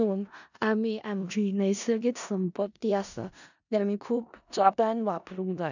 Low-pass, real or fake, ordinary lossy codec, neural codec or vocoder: 7.2 kHz; fake; none; codec, 16 kHz in and 24 kHz out, 0.4 kbps, LongCat-Audio-Codec, four codebook decoder